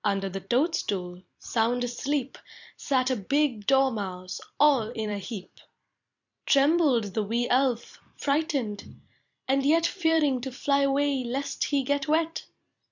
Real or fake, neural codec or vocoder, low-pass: fake; vocoder, 44.1 kHz, 128 mel bands every 256 samples, BigVGAN v2; 7.2 kHz